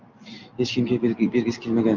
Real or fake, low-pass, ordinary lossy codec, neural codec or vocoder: real; 7.2 kHz; Opus, 24 kbps; none